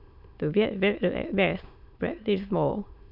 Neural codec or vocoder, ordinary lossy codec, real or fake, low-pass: autoencoder, 22.05 kHz, a latent of 192 numbers a frame, VITS, trained on many speakers; none; fake; 5.4 kHz